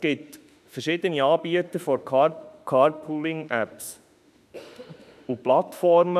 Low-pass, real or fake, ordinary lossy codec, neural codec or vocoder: 14.4 kHz; fake; none; autoencoder, 48 kHz, 32 numbers a frame, DAC-VAE, trained on Japanese speech